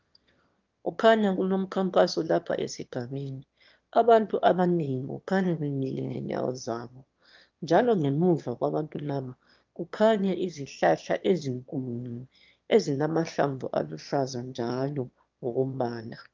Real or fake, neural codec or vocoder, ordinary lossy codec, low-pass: fake; autoencoder, 22.05 kHz, a latent of 192 numbers a frame, VITS, trained on one speaker; Opus, 24 kbps; 7.2 kHz